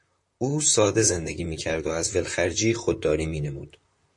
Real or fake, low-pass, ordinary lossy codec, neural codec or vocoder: fake; 10.8 kHz; MP3, 48 kbps; vocoder, 44.1 kHz, 128 mel bands, Pupu-Vocoder